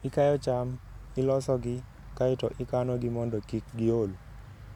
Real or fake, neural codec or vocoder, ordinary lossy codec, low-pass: real; none; none; 19.8 kHz